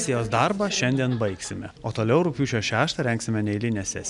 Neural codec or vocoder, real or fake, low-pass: none; real; 10.8 kHz